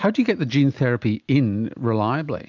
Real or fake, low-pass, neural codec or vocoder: real; 7.2 kHz; none